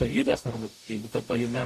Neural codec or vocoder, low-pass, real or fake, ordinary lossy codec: codec, 44.1 kHz, 0.9 kbps, DAC; 14.4 kHz; fake; AAC, 64 kbps